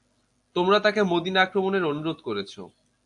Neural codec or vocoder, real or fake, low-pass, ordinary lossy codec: none; real; 10.8 kHz; AAC, 64 kbps